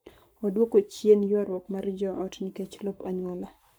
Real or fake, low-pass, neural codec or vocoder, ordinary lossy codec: fake; none; codec, 44.1 kHz, 7.8 kbps, Pupu-Codec; none